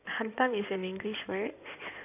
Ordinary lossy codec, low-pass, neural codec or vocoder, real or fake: none; 3.6 kHz; codec, 16 kHz in and 24 kHz out, 2.2 kbps, FireRedTTS-2 codec; fake